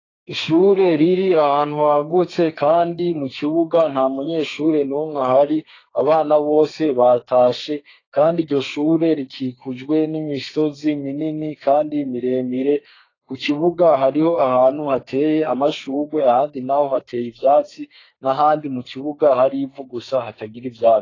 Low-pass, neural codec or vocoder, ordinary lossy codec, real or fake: 7.2 kHz; codec, 32 kHz, 1.9 kbps, SNAC; AAC, 32 kbps; fake